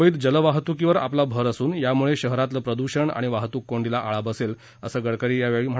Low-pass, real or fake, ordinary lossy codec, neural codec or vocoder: none; real; none; none